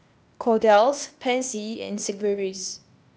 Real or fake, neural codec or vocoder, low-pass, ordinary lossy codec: fake; codec, 16 kHz, 0.8 kbps, ZipCodec; none; none